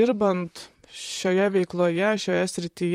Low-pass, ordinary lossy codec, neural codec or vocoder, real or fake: 19.8 kHz; MP3, 64 kbps; vocoder, 44.1 kHz, 128 mel bands, Pupu-Vocoder; fake